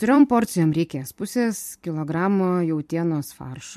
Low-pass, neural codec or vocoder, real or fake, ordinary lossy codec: 14.4 kHz; vocoder, 44.1 kHz, 128 mel bands every 256 samples, BigVGAN v2; fake; MP3, 64 kbps